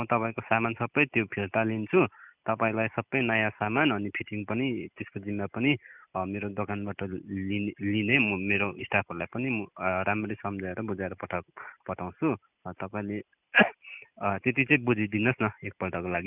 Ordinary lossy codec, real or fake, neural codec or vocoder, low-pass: none; real; none; 3.6 kHz